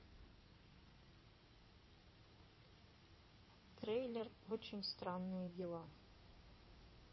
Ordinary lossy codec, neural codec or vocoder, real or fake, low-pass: MP3, 24 kbps; codec, 16 kHz in and 24 kHz out, 2.2 kbps, FireRedTTS-2 codec; fake; 7.2 kHz